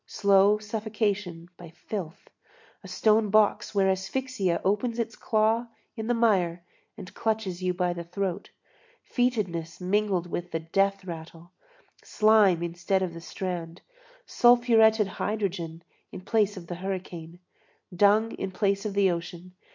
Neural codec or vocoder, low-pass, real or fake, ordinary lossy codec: none; 7.2 kHz; real; MP3, 64 kbps